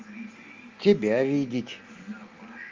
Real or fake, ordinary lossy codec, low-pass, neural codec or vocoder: real; Opus, 32 kbps; 7.2 kHz; none